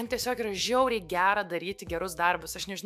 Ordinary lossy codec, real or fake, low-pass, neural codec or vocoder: AAC, 96 kbps; fake; 14.4 kHz; autoencoder, 48 kHz, 128 numbers a frame, DAC-VAE, trained on Japanese speech